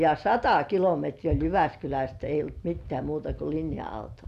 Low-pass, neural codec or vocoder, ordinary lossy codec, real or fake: 14.4 kHz; none; none; real